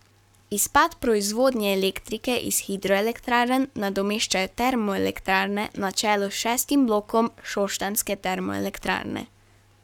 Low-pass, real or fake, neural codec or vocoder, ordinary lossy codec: 19.8 kHz; fake; codec, 44.1 kHz, 7.8 kbps, Pupu-Codec; none